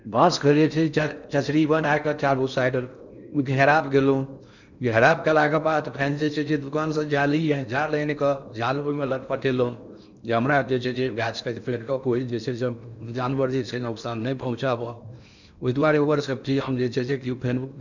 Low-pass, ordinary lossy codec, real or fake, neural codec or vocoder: 7.2 kHz; none; fake; codec, 16 kHz in and 24 kHz out, 0.6 kbps, FocalCodec, streaming, 4096 codes